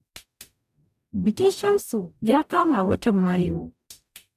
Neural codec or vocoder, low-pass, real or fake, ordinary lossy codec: codec, 44.1 kHz, 0.9 kbps, DAC; 14.4 kHz; fake; none